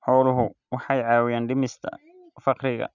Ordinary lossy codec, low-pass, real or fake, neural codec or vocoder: none; 7.2 kHz; real; none